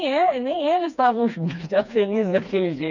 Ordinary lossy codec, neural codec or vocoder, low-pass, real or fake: none; codec, 16 kHz, 2 kbps, FreqCodec, smaller model; 7.2 kHz; fake